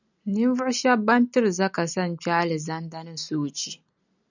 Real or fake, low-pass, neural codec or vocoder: real; 7.2 kHz; none